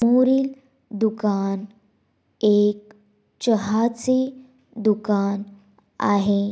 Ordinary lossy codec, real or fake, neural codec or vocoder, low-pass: none; real; none; none